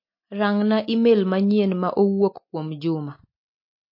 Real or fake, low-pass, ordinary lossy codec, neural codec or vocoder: real; 5.4 kHz; MP3, 32 kbps; none